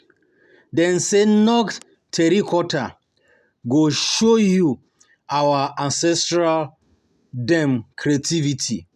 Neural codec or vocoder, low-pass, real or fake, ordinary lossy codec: none; 14.4 kHz; real; none